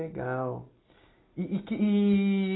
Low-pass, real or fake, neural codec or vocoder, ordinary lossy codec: 7.2 kHz; real; none; AAC, 16 kbps